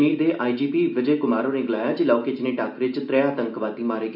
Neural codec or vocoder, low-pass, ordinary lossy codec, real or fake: none; 5.4 kHz; none; real